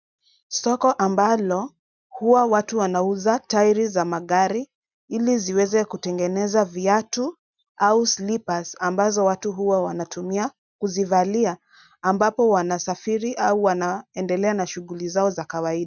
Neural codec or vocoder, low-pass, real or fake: none; 7.2 kHz; real